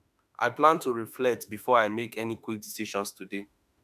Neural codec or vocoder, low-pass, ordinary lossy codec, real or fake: autoencoder, 48 kHz, 32 numbers a frame, DAC-VAE, trained on Japanese speech; 14.4 kHz; none; fake